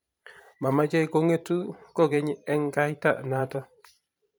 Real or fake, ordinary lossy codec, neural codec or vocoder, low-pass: fake; none; vocoder, 44.1 kHz, 128 mel bands every 512 samples, BigVGAN v2; none